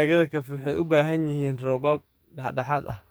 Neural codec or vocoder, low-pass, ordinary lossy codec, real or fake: codec, 44.1 kHz, 2.6 kbps, SNAC; none; none; fake